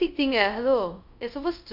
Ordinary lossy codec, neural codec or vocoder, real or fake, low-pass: none; codec, 24 kHz, 0.5 kbps, DualCodec; fake; 5.4 kHz